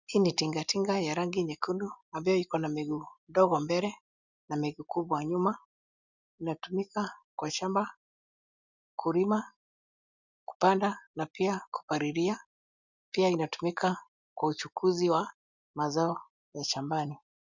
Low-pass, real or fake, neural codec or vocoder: 7.2 kHz; real; none